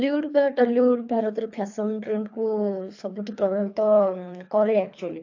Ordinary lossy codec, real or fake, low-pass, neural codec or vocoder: AAC, 48 kbps; fake; 7.2 kHz; codec, 24 kHz, 3 kbps, HILCodec